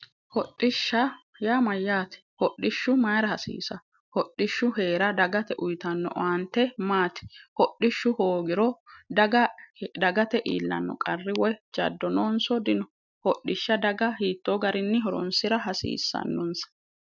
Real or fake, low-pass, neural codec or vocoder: real; 7.2 kHz; none